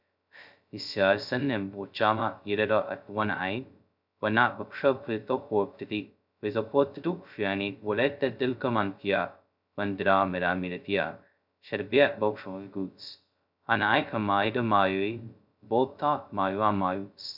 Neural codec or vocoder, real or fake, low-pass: codec, 16 kHz, 0.2 kbps, FocalCodec; fake; 5.4 kHz